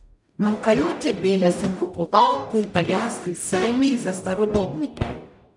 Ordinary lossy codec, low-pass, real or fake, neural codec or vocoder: none; 10.8 kHz; fake; codec, 44.1 kHz, 0.9 kbps, DAC